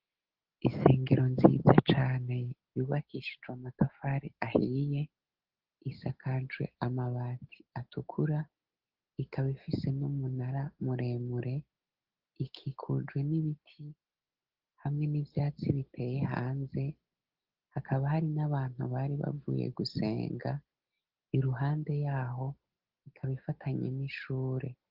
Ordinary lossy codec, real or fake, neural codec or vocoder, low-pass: Opus, 16 kbps; real; none; 5.4 kHz